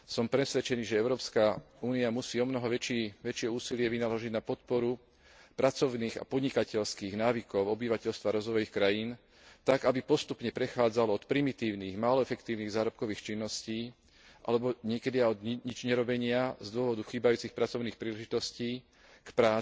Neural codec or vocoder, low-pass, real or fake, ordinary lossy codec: none; none; real; none